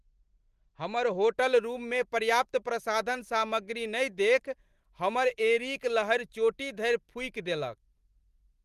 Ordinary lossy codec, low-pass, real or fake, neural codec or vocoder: Opus, 24 kbps; 14.4 kHz; real; none